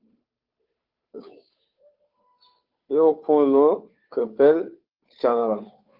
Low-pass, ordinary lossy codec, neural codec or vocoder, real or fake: 5.4 kHz; Opus, 24 kbps; codec, 16 kHz, 2 kbps, FunCodec, trained on Chinese and English, 25 frames a second; fake